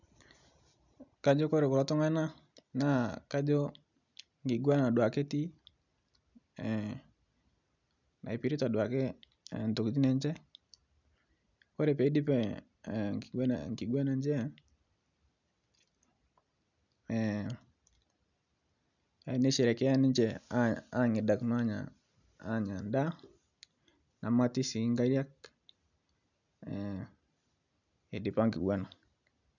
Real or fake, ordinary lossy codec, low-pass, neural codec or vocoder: real; none; 7.2 kHz; none